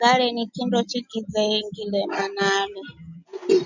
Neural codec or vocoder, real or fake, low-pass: none; real; 7.2 kHz